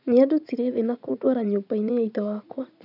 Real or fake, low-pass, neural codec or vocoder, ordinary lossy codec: fake; 5.4 kHz; vocoder, 44.1 kHz, 80 mel bands, Vocos; none